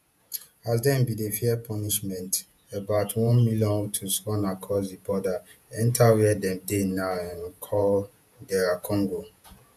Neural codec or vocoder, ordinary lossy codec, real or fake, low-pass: vocoder, 48 kHz, 128 mel bands, Vocos; none; fake; 14.4 kHz